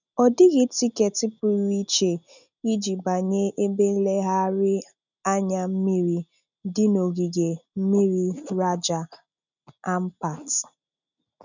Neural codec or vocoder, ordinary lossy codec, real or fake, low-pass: none; none; real; 7.2 kHz